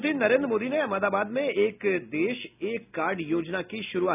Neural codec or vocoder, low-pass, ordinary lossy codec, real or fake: none; 3.6 kHz; none; real